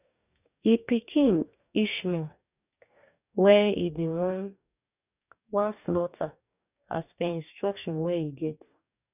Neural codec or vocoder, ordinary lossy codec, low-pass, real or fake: codec, 44.1 kHz, 2.6 kbps, DAC; none; 3.6 kHz; fake